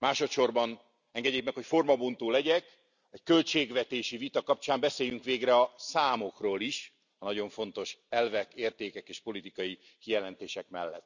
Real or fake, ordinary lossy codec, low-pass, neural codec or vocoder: real; none; 7.2 kHz; none